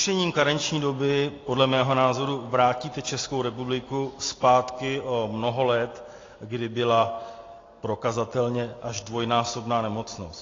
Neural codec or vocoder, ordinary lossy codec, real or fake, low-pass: none; AAC, 32 kbps; real; 7.2 kHz